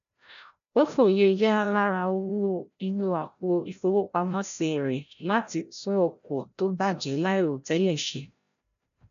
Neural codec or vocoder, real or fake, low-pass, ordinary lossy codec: codec, 16 kHz, 0.5 kbps, FreqCodec, larger model; fake; 7.2 kHz; none